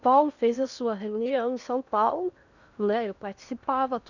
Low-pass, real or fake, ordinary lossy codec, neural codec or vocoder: 7.2 kHz; fake; none; codec, 16 kHz in and 24 kHz out, 0.8 kbps, FocalCodec, streaming, 65536 codes